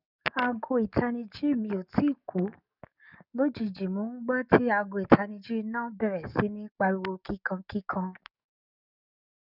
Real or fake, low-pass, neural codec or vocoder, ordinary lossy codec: fake; 5.4 kHz; codec, 44.1 kHz, 7.8 kbps, DAC; none